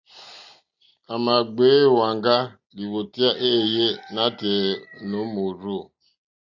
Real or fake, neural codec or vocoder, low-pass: real; none; 7.2 kHz